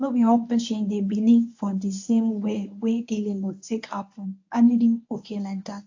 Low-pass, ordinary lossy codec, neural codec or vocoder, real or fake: 7.2 kHz; AAC, 48 kbps; codec, 24 kHz, 0.9 kbps, WavTokenizer, medium speech release version 1; fake